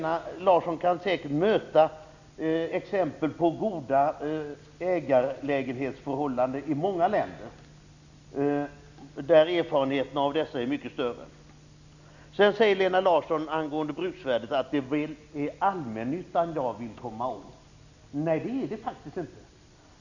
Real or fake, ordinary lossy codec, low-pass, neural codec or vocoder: real; none; 7.2 kHz; none